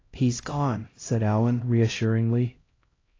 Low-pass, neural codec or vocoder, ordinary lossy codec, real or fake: 7.2 kHz; codec, 16 kHz, 0.5 kbps, X-Codec, HuBERT features, trained on LibriSpeech; AAC, 32 kbps; fake